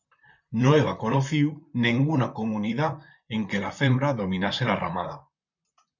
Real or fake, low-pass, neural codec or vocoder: fake; 7.2 kHz; vocoder, 44.1 kHz, 128 mel bands, Pupu-Vocoder